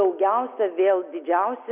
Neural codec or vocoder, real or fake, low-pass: none; real; 3.6 kHz